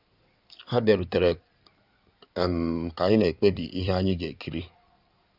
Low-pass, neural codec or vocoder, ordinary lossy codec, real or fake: 5.4 kHz; codec, 16 kHz in and 24 kHz out, 2.2 kbps, FireRedTTS-2 codec; none; fake